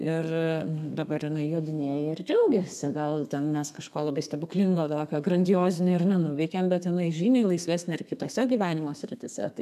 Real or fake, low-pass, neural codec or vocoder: fake; 14.4 kHz; codec, 32 kHz, 1.9 kbps, SNAC